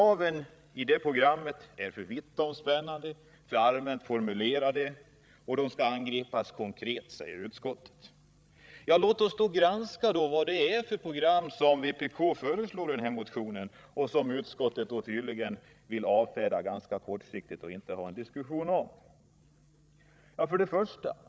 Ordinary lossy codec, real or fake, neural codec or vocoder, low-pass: none; fake; codec, 16 kHz, 16 kbps, FreqCodec, larger model; none